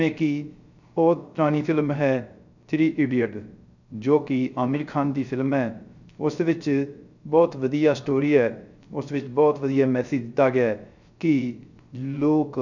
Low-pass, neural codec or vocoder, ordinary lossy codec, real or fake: 7.2 kHz; codec, 16 kHz, 0.3 kbps, FocalCodec; none; fake